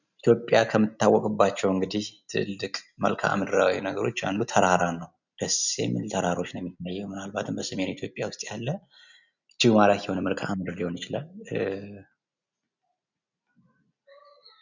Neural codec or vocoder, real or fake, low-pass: none; real; 7.2 kHz